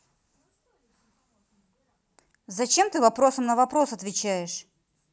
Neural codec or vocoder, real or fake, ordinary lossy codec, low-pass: none; real; none; none